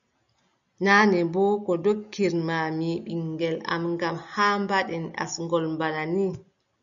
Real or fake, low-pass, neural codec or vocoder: real; 7.2 kHz; none